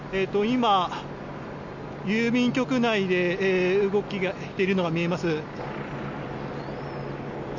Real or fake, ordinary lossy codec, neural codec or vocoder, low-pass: real; none; none; 7.2 kHz